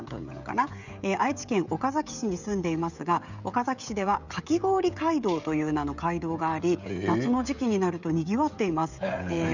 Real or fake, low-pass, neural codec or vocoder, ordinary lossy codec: fake; 7.2 kHz; codec, 16 kHz, 16 kbps, FreqCodec, smaller model; none